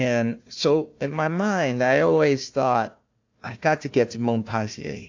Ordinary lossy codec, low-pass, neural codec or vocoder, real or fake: AAC, 48 kbps; 7.2 kHz; codec, 16 kHz, 1 kbps, FunCodec, trained on Chinese and English, 50 frames a second; fake